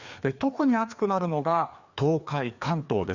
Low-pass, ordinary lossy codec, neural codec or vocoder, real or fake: 7.2 kHz; Opus, 64 kbps; codec, 16 kHz, 2 kbps, FreqCodec, larger model; fake